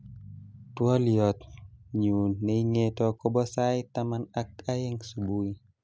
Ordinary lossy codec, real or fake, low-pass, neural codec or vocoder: none; real; none; none